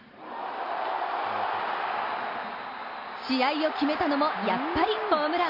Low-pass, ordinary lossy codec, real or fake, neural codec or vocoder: 5.4 kHz; MP3, 32 kbps; real; none